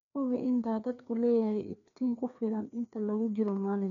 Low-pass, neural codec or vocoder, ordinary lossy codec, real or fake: 7.2 kHz; codec, 16 kHz, 2 kbps, FreqCodec, larger model; none; fake